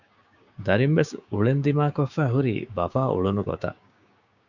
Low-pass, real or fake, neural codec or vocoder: 7.2 kHz; fake; codec, 16 kHz, 6 kbps, DAC